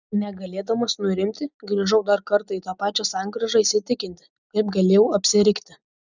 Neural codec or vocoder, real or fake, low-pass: none; real; 7.2 kHz